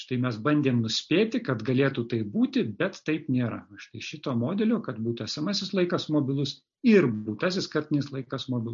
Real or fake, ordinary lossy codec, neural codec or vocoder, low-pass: real; MP3, 48 kbps; none; 7.2 kHz